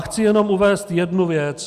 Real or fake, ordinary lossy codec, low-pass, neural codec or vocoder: real; Opus, 64 kbps; 14.4 kHz; none